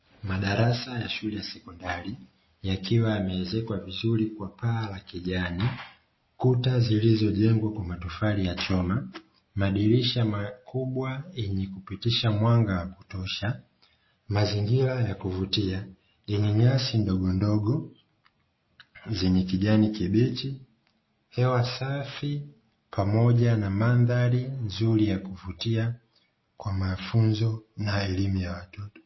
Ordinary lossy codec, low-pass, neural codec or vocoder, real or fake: MP3, 24 kbps; 7.2 kHz; none; real